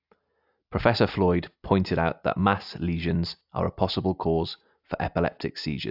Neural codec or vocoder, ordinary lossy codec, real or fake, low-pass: none; AAC, 48 kbps; real; 5.4 kHz